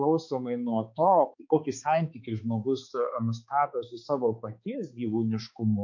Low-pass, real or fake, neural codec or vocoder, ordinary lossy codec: 7.2 kHz; fake; codec, 16 kHz, 4 kbps, X-Codec, HuBERT features, trained on balanced general audio; MP3, 48 kbps